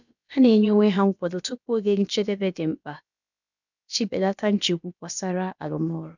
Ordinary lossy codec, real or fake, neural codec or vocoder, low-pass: none; fake; codec, 16 kHz, about 1 kbps, DyCAST, with the encoder's durations; 7.2 kHz